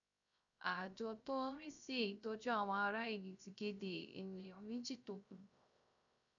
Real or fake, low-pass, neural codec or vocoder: fake; 7.2 kHz; codec, 16 kHz, 0.3 kbps, FocalCodec